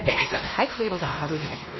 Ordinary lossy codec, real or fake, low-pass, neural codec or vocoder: MP3, 24 kbps; fake; 7.2 kHz; codec, 16 kHz, 1 kbps, X-Codec, WavLM features, trained on Multilingual LibriSpeech